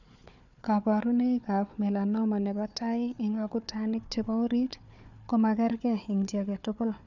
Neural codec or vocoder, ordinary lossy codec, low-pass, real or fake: codec, 16 kHz, 4 kbps, FunCodec, trained on Chinese and English, 50 frames a second; none; 7.2 kHz; fake